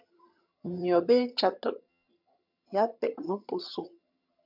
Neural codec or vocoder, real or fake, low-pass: vocoder, 22.05 kHz, 80 mel bands, HiFi-GAN; fake; 5.4 kHz